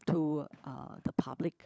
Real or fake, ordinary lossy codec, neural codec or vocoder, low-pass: fake; none; codec, 16 kHz, 16 kbps, FunCodec, trained on LibriTTS, 50 frames a second; none